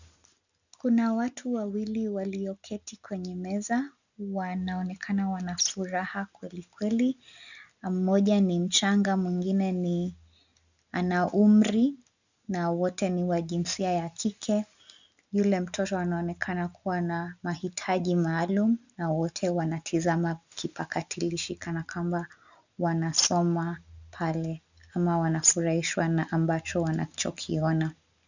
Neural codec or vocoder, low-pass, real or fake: none; 7.2 kHz; real